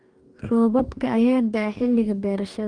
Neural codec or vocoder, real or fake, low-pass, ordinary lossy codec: codec, 44.1 kHz, 2.6 kbps, DAC; fake; 9.9 kHz; Opus, 32 kbps